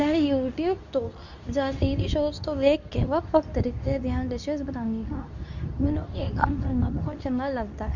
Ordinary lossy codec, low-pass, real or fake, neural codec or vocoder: none; 7.2 kHz; fake; codec, 24 kHz, 0.9 kbps, WavTokenizer, medium speech release version 2